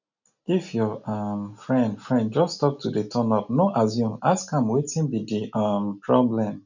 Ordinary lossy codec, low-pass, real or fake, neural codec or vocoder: none; 7.2 kHz; real; none